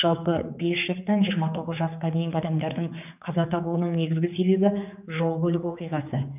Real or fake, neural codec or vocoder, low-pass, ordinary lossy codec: fake; codec, 16 kHz, 4 kbps, X-Codec, HuBERT features, trained on general audio; 3.6 kHz; none